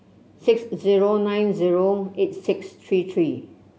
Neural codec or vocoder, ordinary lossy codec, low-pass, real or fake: none; none; none; real